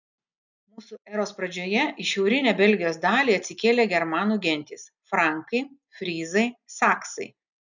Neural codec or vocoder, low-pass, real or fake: none; 7.2 kHz; real